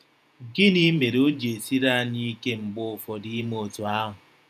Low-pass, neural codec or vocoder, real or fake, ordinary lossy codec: 14.4 kHz; vocoder, 48 kHz, 128 mel bands, Vocos; fake; none